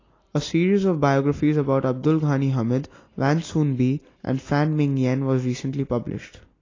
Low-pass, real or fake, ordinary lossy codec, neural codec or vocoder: 7.2 kHz; real; AAC, 32 kbps; none